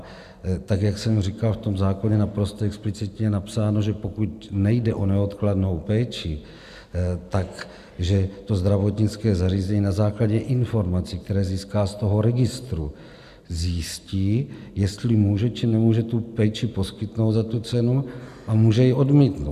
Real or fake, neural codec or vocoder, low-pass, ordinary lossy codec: real; none; 14.4 kHz; AAC, 96 kbps